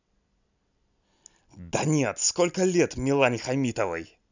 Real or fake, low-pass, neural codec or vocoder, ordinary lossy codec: real; 7.2 kHz; none; none